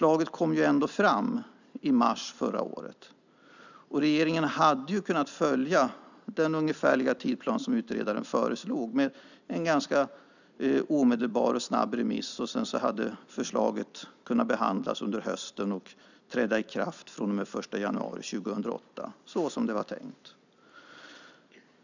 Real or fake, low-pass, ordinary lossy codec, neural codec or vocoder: real; 7.2 kHz; none; none